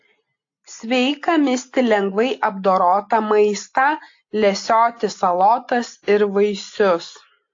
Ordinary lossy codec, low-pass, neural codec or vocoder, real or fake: AAC, 48 kbps; 7.2 kHz; none; real